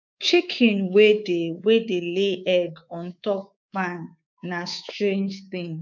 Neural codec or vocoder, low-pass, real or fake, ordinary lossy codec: codec, 24 kHz, 3.1 kbps, DualCodec; 7.2 kHz; fake; none